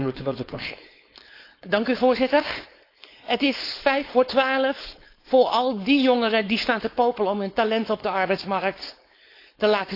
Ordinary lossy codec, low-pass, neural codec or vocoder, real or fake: AAC, 32 kbps; 5.4 kHz; codec, 16 kHz, 4.8 kbps, FACodec; fake